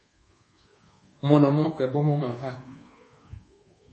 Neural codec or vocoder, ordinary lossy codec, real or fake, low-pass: codec, 24 kHz, 1.2 kbps, DualCodec; MP3, 32 kbps; fake; 10.8 kHz